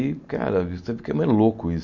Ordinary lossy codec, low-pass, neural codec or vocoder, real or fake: MP3, 48 kbps; 7.2 kHz; none; real